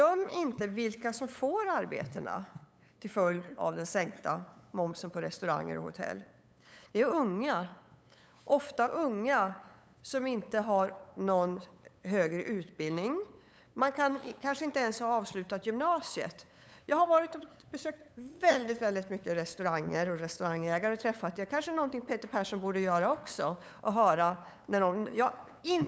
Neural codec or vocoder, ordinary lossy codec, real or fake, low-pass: codec, 16 kHz, 8 kbps, FunCodec, trained on LibriTTS, 25 frames a second; none; fake; none